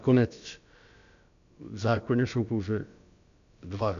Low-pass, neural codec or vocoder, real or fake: 7.2 kHz; codec, 16 kHz, about 1 kbps, DyCAST, with the encoder's durations; fake